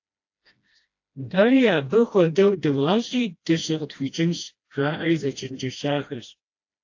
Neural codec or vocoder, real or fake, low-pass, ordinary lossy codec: codec, 16 kHz, 1 kbps, FreqCodec, smaller model; fake; 7.2 kHz; AAC, 48 kbps